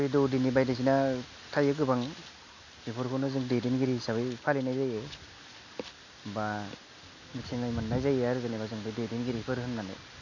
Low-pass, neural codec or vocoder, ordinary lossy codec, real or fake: 7.2 kHz; none; none; real